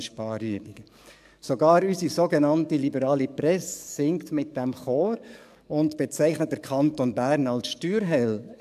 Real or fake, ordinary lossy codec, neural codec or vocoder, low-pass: fake; MP3, 96 kbps; codec, 44.1 kHz, 7.8 kbps, DAC; 14.4 kHz